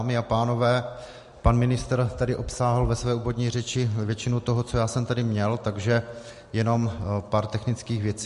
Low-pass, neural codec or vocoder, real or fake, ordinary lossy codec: 14.4 kHz; none; real; MP3, 48 kbps